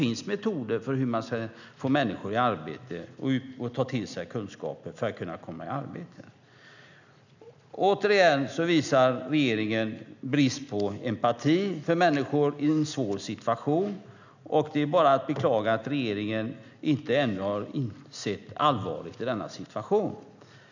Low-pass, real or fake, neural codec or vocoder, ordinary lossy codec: 7.2 kHz; real; none; none